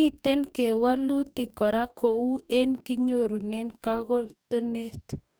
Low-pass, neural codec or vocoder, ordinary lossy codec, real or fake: none; codec, 44.1 kHz, 2.6 kbps, DAC; none; fake